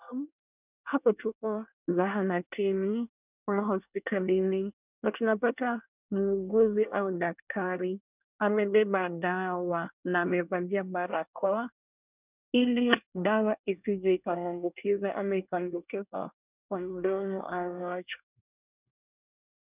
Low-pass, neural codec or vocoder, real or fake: 3.6 kHz; codec, 24 kHz, 1 kbps, SNAC; fake